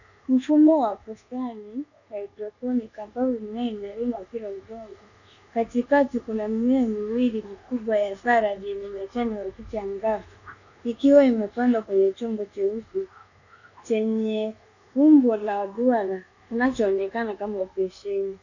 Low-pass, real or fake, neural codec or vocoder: 7.2 kHz; fake; codec, 24 kHz, 1.2 kbps, DualCodec